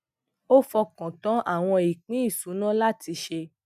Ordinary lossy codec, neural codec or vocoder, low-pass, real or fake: none; none; 14.4 kHz; real